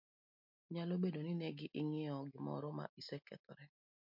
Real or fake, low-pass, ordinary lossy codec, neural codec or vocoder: real; 5.4 kHz; MP3, 48 kbps; none